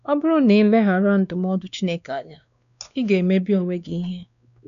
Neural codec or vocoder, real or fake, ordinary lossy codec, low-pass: codec, 16 kHz, 2 kbps, X-Codec, WavLM features, trained on Multilingual LibriSpeech; fake; none; 7.2 kHz